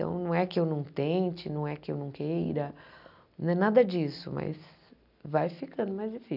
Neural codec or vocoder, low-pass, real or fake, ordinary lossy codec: none; 5.4 kHz; real; none